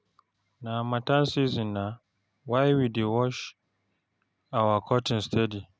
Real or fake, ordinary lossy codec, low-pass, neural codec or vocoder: real; none; none; none